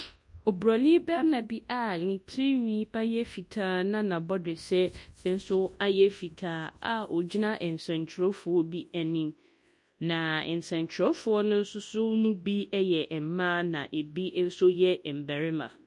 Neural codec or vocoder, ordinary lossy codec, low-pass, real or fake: codec, 24 kHz, 0.9 kbps, WavTokenizer, large speech release; MP3, 48 kbps; 10.8 kHz; fake